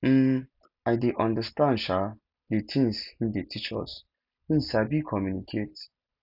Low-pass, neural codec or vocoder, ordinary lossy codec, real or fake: 5.4 kHz; none; none; real